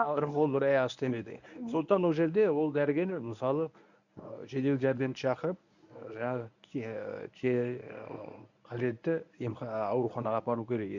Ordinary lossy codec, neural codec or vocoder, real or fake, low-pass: none; codec, 24 kHz, 0.9 kbps, WavTokenizer, medium speech release version 1; fake; 7.2 kHz